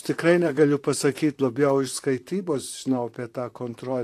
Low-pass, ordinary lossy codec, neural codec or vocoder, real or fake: 14.4 kHz; AAC, 64 kbps; vocoder, 44.1 kHz, 128 mel bands, Pupu-Vocoder; fake